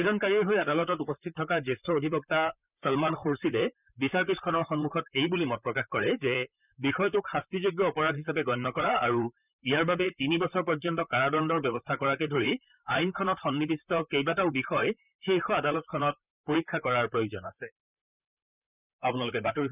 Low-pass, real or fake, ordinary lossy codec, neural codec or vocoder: 3.6 kHz; fake; none; vocoder, 44.1 kHz, 128 mel bands, Pupu-Vocoder